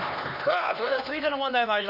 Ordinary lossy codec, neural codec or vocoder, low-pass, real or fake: none; codec, 16 kHz, 2 kbps, X-Codec, HuBERT features, trained on LibriSpeech; 5.4 kHz; fake